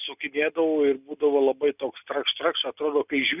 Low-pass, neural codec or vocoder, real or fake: 3.6 kHz; none; real